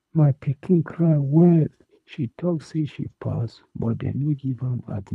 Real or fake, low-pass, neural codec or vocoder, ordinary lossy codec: fake; none; codec, 24 kHz, 3 kbps, HILCodec; none